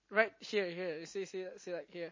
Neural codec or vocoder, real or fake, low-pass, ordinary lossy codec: none; real; 7.2 kHz; MP3, 32 kbps